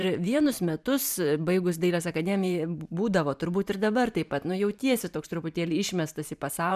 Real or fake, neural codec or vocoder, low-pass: fake; vocoder, 44.1 kHz, 128 mel bands every 512 samples, BigVGAN v2; 14.4 kHz